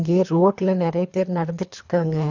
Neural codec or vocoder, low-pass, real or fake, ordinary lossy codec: codec, 24 kHz, 3 kbps, HILCodec; 7.2 kHz; fake; none